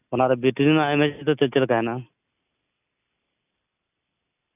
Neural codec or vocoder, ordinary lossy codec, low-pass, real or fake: none; none; 3.6 kHz; real